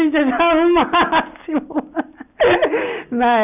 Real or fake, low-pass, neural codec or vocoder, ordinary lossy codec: real; 3.6 kHz; none; none